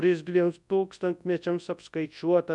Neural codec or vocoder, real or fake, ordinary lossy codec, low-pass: codec, 24 kHz, 0.9 kbps, WavTokenizer, large speech release; fake; MP3, 96 kbps; 10.8 kHz